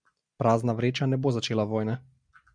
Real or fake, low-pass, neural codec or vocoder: real; 9.9 kHz; none